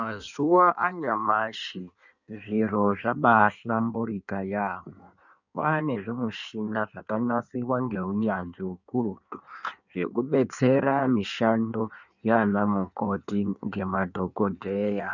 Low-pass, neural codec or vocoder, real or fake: 7.2 kHz; codec, 16 kHz in and 24 kHz out, 1.1 kbps, FireRedTTS-2 codec; fake